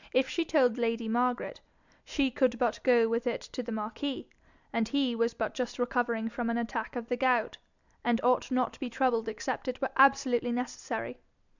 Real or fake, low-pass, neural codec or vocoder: real; 7.2 kHz; none